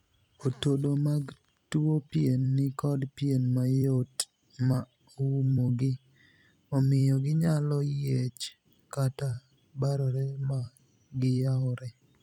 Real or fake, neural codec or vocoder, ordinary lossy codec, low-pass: fake; vocoder, 44.1 kHz, 128 mel bands every 256 samples, BigVGAN v2; none; 19.8 kHz